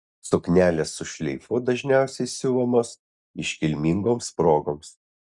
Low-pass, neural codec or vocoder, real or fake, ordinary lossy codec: 10.8 kHz; none; real; Opus, 64 kbps